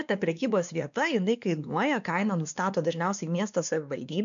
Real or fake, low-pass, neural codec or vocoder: fake; 7.2 kHz; codec, 16 kHz, 2 kbps, X-Codec, WavLM features, trained on Multilingual LibriSpeech